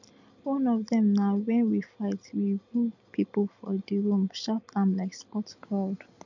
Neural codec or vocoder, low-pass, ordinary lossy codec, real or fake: none; 7.2 kHz; none; real